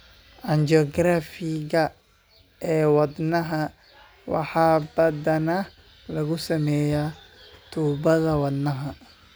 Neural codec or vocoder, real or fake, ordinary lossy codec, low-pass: none; real; none; none